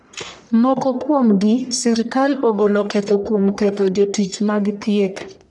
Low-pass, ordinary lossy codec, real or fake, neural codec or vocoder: 10.8 kHz; none; fake; codec, 44.1 kHz, 1.7 kbps, Pupu-Codec